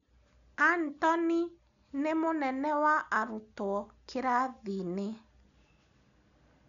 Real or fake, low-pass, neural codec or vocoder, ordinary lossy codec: real; 7.2 kHz; none; none